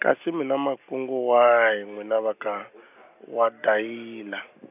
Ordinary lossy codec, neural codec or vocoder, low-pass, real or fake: none; none; 3.6 kHz; real